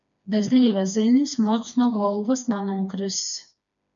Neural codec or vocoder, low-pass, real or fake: codec, 16 kHz, 2 kbps, FreqCodec, smaller model; 7.2 kHz; fake